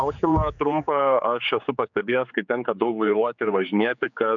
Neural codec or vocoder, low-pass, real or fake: codec, 16 kHz, 4 kbps, X-Codec, HuBERT features, trained on general audio; 7.2 kHz; fake